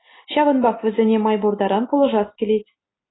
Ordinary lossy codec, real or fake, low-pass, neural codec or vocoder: AAC, 16 kbps; real; 7.2 kHz; none